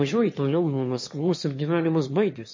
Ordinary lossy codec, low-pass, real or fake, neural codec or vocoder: MP3, 32 kbps; 7.2 kHz; fake; autoencoder, 22.05 kHz, a latent of 192 numbers a frame, VITS, trained on one speaker